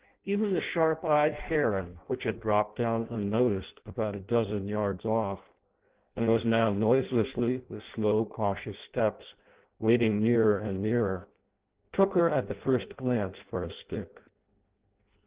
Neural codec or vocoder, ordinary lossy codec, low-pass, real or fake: codec, 16 kHz in and 24 kHz out, 0.6 kbps, FireRedTTS-2 codec; Opus, 16 kbps; 3.6 kHz; fake